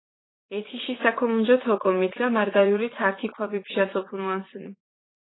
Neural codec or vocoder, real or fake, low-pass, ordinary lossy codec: vocoder, 44.1 kHz, 80 mel bands, Vocos; fake; 7.2 kHz; AAC, 16 kbps